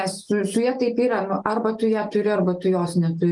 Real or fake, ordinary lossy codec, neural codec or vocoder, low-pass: real; Opus, 32 kbps; none; 10.8 kHz